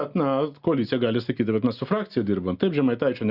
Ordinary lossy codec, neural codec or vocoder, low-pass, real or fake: Opus, 64 kbps; none; 5.4 kHz; real